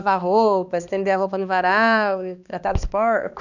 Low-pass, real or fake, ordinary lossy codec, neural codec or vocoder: 7.2 kHz; fake; none; codec, 16 kHz, 2 kbps, X-Codec, HuBERT features, trained on balanced general audio